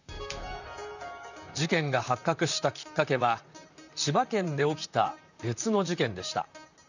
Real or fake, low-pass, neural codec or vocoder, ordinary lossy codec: fake; 7.2 kHz; vocoder, 44.1 kHz, 128 mel bands, Pupu-Vocoder; none